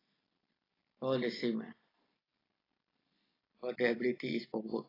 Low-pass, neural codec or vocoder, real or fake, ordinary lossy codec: 5.4 kHz; none; real; AAC, 24 kbps